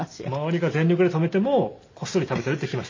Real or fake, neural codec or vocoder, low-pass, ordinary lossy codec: real; none; 7.2 kHz; MP3, 32 kbps